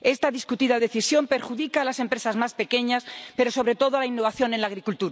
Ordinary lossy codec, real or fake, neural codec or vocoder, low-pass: none; real; none; none